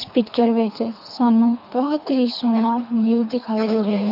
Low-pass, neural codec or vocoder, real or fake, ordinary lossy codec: 5.4 kHz; codec, 24 kHz, 3 kbps, HILCodec; fake; none